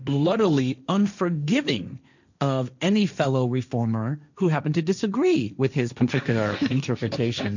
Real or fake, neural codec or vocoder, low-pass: fake; codec, 16 kHz, 1.1 kbps, Voila-Tokenizer; 7.2 kHz